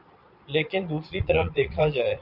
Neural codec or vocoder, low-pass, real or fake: vocoder, 22.05 kHz, 80 mel bands, Vocos; 5.4 kHz; fake